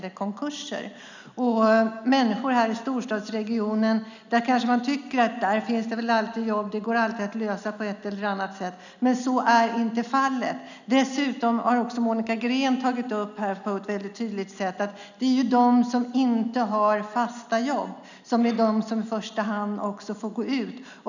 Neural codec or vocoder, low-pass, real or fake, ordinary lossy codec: none; 7.2 kHz; real; none